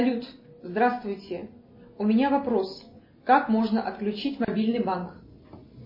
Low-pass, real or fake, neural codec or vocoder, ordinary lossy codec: 5.4 kHz; real; none; MP3, 24 kbps